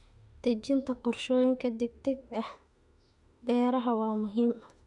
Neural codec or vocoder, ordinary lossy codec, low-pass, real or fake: autoencoder, 48 kHz, 32 numbers a frame, DAC-VAE, trained on Japanese speech; none; 10.8 kHz; fake